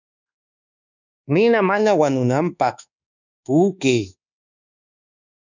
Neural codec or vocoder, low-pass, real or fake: codec, 24 kHz, 1.2 kbps, DualCodec; 7.2 kHz; fake